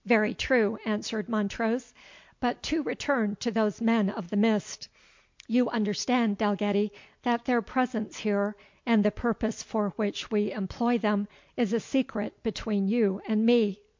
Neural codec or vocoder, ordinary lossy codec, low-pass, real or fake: none; MP3, 48 kbps; 7.2 kHz; real